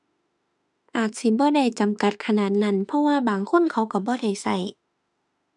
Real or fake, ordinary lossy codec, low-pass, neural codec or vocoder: fake; none; 10.8 kHz; autoencoder, 48 kHz, 32 numbers a frame, DAC-VAE, trained on Japanese speech